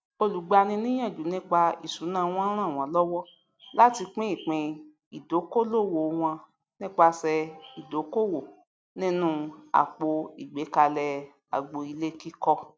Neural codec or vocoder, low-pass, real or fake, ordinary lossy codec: none; none; real; none